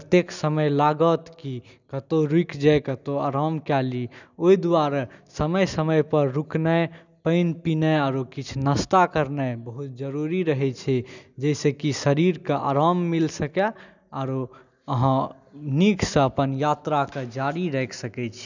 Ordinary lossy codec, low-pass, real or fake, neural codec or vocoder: none; 7.2 kHz; real; none